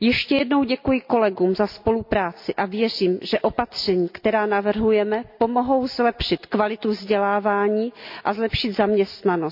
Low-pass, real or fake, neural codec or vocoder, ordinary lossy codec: 5.4 kHz; real; none; MP3, 48 kbps